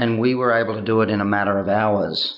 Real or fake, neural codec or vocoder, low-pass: real; none; 5.4 kHz